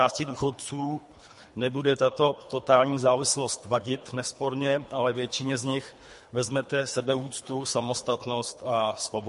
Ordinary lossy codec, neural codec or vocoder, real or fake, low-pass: MP3, 48 kbps; codec, 24 kHz, 3 kbps, HILCodec; fake; 10.8 kHz